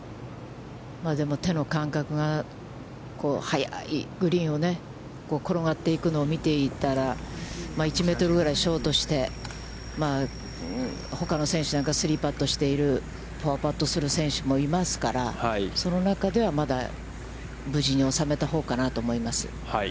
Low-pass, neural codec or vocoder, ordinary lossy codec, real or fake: none; none; none; real